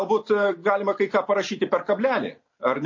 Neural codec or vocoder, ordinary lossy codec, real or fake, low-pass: none; MP3, 32 kbps; real; 7.2 kHz